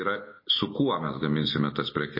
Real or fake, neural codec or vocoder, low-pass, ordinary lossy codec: real; none; 5.4 kHz; MP3, 24 kbps